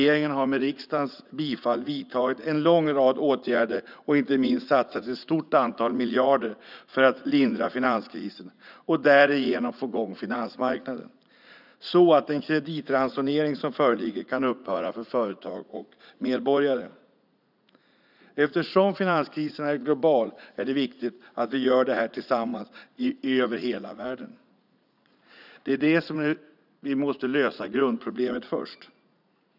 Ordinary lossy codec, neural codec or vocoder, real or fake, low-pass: none; vocoder, 44.1 kHz, 80 mel bands, Vocos; fake; 5.4 kHz